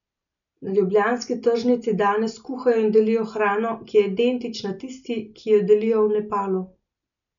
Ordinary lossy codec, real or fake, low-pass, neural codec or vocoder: none; real; 7.2 kHz; none